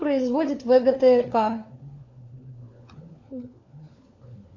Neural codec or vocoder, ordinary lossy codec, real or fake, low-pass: codec, 16 kHz, 4 kbps, FunCodec, trained on LibriTTS, 50 frames a second; MP3, 48 kbps; fake; 7.2 kHz